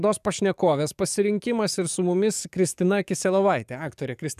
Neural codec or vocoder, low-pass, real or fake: codec, 44.1 kHz, 7.8 kbps, DAC; 14.4 kHz; fake